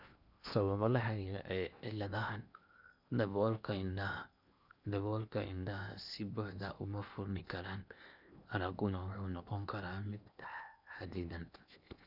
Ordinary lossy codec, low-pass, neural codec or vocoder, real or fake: none; 5.4 kHz; codec, 16 kHz in and 24 kHz out, 0.8 kbps, FocalCodec, streaming, 65536 codes; fake